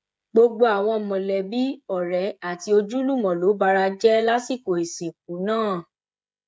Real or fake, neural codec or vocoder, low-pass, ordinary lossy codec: fake; codec, 16 kHz, 16 kbps, FreqCodec, smaller model; none; none